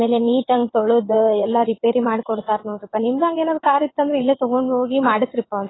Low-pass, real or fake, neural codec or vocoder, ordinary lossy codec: 7.2 kHz; fake; vocoder, 22.05 kHz, 80 mel bands, WaveNeXt; AAC, 16 kbps